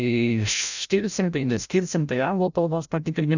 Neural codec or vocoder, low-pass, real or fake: codec, 16 kHz, 0.5 kbps, FreqCodec, larger model; 7.2 kHz; fake